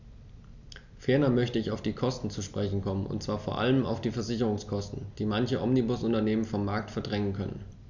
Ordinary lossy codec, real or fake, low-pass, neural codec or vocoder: none; real; 7.2 kHz; none